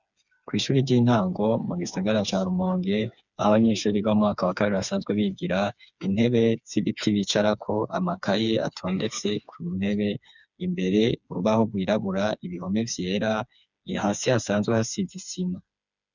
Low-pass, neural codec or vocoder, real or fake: 7.2 kHz; codec, 16 kHz, 4 kbps, FreqCodec, smaller model; fake